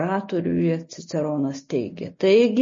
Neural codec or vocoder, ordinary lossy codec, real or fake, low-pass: none; MP3, 32 kbps; real; 7.2 kHz